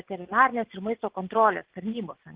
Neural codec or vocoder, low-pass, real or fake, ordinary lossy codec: none; 3.6 kHz; real; Opus, 16 kbps